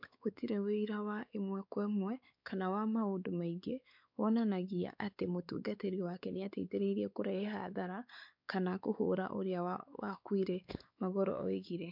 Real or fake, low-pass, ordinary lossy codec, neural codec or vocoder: fake; 5.4 kHz; MP3, 48 kbps; codec, 16 kHz, 4 kbps, X-Codec, WavLM features, trained on Multilingual LibriSpeech